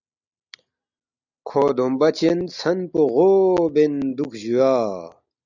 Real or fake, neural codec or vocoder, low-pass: real; none; 7.2 kHz